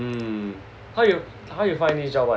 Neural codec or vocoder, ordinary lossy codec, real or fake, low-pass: none; none; real; none